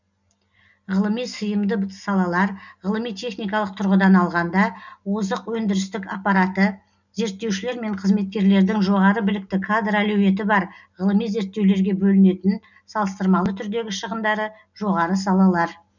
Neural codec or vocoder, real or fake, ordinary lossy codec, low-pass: none; real; none; 7.2 kHz